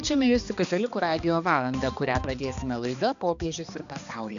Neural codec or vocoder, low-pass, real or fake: codec, 16 kHz, 2 kbps, X-Codec, HuBERT features, trained on balanced general audio; 7.2 kHz; fake